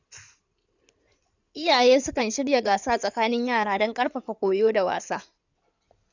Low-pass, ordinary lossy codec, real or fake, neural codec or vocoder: 7.2 kHz; none; fake; codec, 16 kHz in and 24 kHz out, 2.2 kbps, FireRedTTS-2 codec